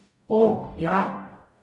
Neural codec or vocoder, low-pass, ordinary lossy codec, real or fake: codec, 44.1 kHz, 0.9 kbps, DAC; 10.8 kHz; none; fake